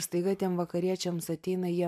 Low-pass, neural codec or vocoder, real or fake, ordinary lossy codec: 14.4 kHz; vocoder, 44.1 kHz, 128 mel bands every 256 samples, BigVGAN v2; fake; MP3, 96 kbps